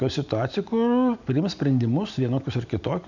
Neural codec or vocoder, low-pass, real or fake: none; 7.2 kHz; real